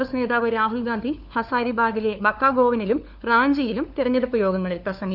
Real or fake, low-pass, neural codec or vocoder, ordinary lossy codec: fake; 5.4 kHz; codec, 16 kHz, 4 kbps, FunCodec, trained on LibriTTS, 50 frames a second; none